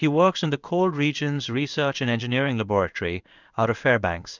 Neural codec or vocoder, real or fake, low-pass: codec, 16 kHz in and 24 kHz out, 1 kbps, XY-Tokenizer; fake; 7.2 kHz